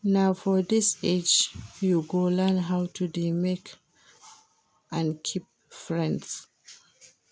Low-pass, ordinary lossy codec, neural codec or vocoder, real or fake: none; none; none; real